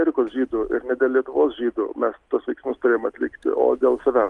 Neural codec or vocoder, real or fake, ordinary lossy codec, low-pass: none; real; Opus, 24 kbps; 10.8 kHz